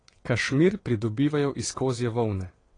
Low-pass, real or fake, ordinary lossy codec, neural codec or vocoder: 9.9 kHz; fake; AAC, 32 kbps; vocoder, 22.05 kHz, 80 mel bands, WaveNeXt